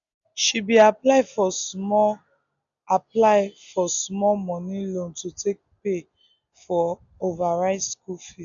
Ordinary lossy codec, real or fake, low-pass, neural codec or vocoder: none; real; 7.2 kHz; none